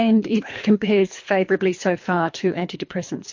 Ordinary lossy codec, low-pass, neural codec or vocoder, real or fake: MP3, 48 kbps; 7.2 kHz; codec, 24 kHz, 3 kbps, HILCodec; fake